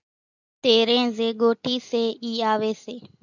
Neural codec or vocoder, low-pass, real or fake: none; 7.2 kHz; real